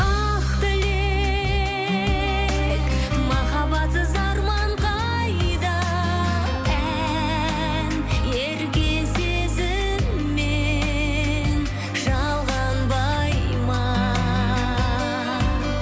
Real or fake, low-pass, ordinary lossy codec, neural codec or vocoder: real; none; none; none